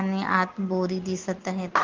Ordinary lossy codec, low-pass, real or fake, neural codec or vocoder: Opus, 16 kbps; 7.2 kHz; real; none